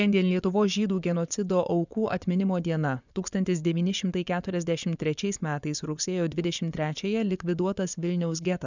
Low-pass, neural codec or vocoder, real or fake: 7.2 kHz; vocoder, 24 kHz, 100 mel bands, Vocos; fake